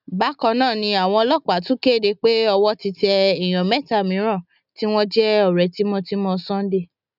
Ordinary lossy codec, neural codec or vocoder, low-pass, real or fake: none; none; 5.4 kHz; real